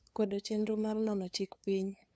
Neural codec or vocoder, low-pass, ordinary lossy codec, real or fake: codec, 16 kHz, 2 kbps, FunCodec, trained on LibriTTS, 25 frames a second; none; none; fake